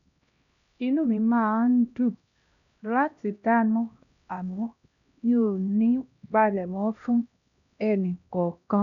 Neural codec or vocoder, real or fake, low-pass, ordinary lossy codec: codec, 16 kHz, 1 kbps, X-Codec, HuBERT features, trained on LibriSpeech; fake; 7.2 kHz; none